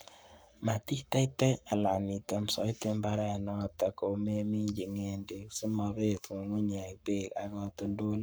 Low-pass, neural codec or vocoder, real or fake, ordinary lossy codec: none; codec, 44.1 kHz, 7.8 kbps, Pupu-Codec; fake; none